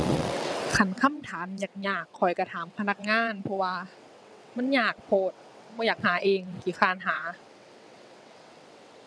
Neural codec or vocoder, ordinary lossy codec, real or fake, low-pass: vocoder, 22.05 kHz, 80 mel bands, WaveNeXt; none; fake; none